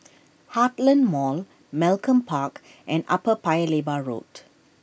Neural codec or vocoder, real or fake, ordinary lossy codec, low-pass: none; real; none; none